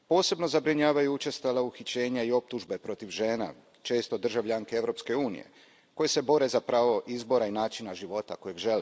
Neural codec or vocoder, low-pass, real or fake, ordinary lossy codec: none; none; real; none